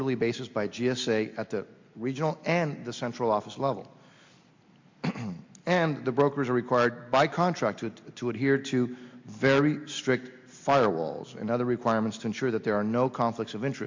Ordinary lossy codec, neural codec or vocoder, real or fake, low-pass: MP3, 48 kbps; none; real; 7.2 kHz